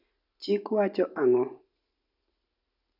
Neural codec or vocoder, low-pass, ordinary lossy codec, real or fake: none; 5.4 kHz; none; real